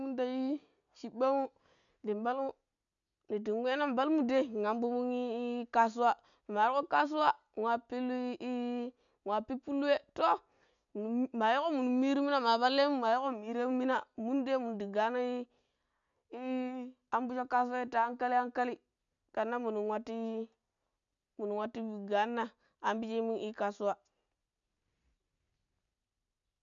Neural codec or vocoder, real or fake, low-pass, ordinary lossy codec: none; real; 7.2 kHz; none